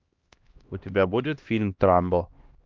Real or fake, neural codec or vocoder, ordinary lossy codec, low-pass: fake; codec, 16 kHz, 1 kbps, X-Codec, HuBERT features, trained on LibriSpeech; Opus, 24 kbps; 7.2 kHz